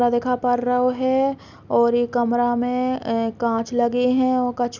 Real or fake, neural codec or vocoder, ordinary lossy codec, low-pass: real; none; none; 7.2 kHz